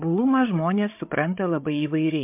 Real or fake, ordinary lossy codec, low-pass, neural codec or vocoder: fake; MP3, 32 kbps; 3.6 kHz; codec, 16 kHz, 8 kbps, FreqCodec, larger model